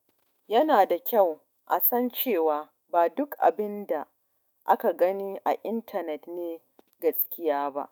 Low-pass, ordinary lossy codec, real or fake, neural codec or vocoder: none; none; fake; autoencoder, 48 kHz, 128 numbers a frame, DAC-VAE, trained on Japanese speech